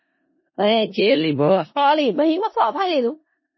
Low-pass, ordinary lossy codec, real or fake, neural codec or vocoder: 7.2 kHz; MP3, 24 kbps; fake; codec, 16 kHz in and 24 kHz out, 0.4 kbps, LongCat-Audio-Codec, four codebook decoder